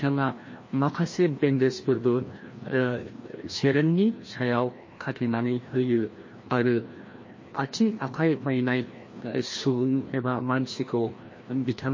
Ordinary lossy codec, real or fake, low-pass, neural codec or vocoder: MP3, 32 kbps; fake; 7.2 kHz; codec, 16 kHz, 1 kbps, FreqCodec, larger model